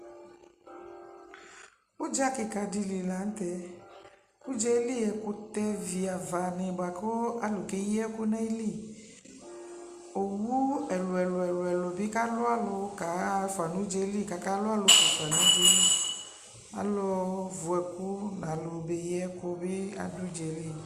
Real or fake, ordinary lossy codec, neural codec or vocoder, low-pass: real; Opus, 64 kbps; none; 14.4 kHz